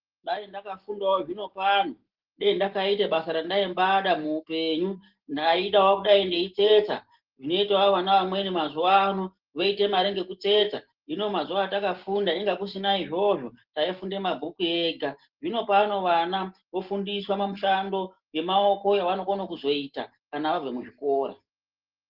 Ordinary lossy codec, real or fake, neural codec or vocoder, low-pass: Opus, 16 kbps; real; none; 5.4 kHz